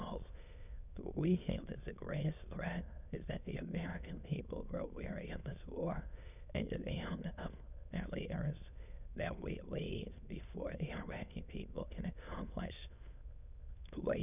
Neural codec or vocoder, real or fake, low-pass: autoencoder, 22.05 kHz, a latent of 192 numbers a frame, VITS, trained on many speakers; fake; 3.6 kHz